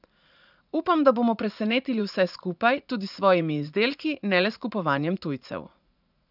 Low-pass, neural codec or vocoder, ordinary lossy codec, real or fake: 5.4 kHz; vocoder, 44.1 kHz, 80 mel bands, Vocos; none; fake